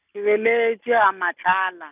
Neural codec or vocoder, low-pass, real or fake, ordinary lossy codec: none; 3.6 kHz; real; none